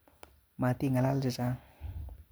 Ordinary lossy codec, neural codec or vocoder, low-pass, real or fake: none; none; none; real